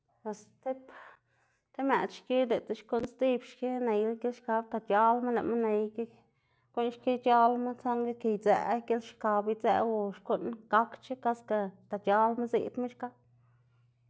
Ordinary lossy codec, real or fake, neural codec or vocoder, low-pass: none; real; none; none